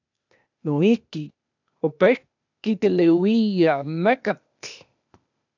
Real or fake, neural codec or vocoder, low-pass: fake; codec, 16 kHz, 0.8 kbps, ZipCodec; 7.2 kHz